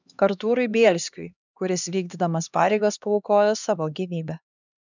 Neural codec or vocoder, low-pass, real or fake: codec, 16 kHz, 2 kbps, X-Codec, HuBERT features, trained on LibriSpeech; 7.2 kHz; fake